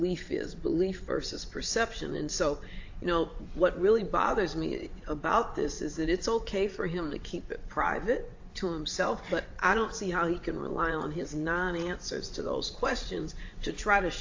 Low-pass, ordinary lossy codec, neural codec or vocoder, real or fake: 7.2 kHz; AAC, 48 kbps; vocoder, 22.05 kHz, 80 mel bands, WaveNeXt; fake